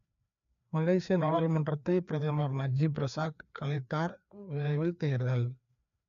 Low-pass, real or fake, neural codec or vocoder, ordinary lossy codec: 7.2 kHz; fake; codec, 16 kHz, 2 kbps, FreqCodec, larger model; none